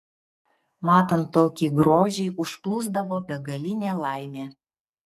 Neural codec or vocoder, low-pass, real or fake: codec, 44.1 kHz, 2.6 kbps, SNAC; 14.4 kHz; fake